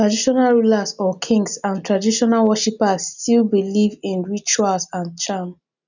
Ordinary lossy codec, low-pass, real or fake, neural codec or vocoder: none; 7.2 kHz; real; none